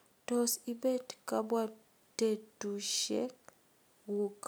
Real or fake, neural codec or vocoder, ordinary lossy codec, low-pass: real; none; none; none